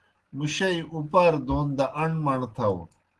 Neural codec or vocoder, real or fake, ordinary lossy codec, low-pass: none; real; Opus, 16 kbps; 10.8 kHz